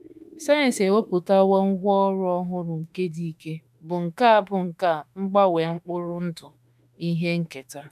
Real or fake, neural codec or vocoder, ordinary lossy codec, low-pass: fake; autoencoder, 48 kHz, 32 numbers a frame, DAC-VAE, trained on Japanese speech; none; 14.4 kHz